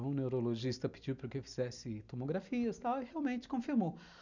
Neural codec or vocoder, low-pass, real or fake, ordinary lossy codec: none; 7.2 kHz; real; none